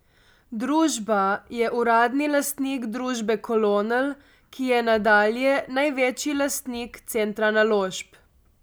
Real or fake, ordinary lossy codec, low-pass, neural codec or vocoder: real; none; none; none